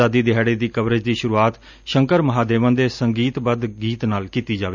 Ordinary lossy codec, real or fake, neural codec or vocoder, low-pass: none; real; none; 7.2 kHz